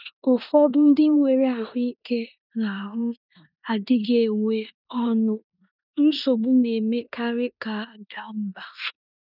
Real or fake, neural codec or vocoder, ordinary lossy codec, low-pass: fake; codec, 16 kHz in and 24 kHz out, 0.9 kbps, LongCat-Audio-Codec, four codebook decoder; none; 5.4 kHz